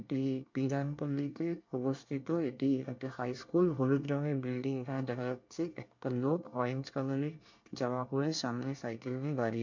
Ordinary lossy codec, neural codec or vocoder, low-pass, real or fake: MP3, 48 kbps; codec, 24 kHz, 1 kbps, SNAC; 7.2 kHz; fake